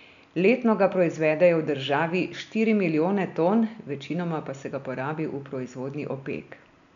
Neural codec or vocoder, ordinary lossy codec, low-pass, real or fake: none; none; 7.2 kHz; real